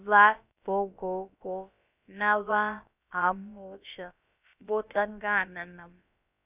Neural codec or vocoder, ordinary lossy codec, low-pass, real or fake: codec, 16 kHz, about 1 kbps, DyCAST, with the encoder's durations; none; 3.6 kHz; fake